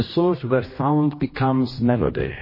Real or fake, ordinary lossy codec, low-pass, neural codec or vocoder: fake; MP3, 24 kbps; 5.4 kHz; codec, 16 kHz, 1 kbps, X-Codec, HuBERT features, trained on general audio